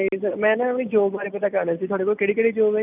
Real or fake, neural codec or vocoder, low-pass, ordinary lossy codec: real; none; 3.6 kHz; none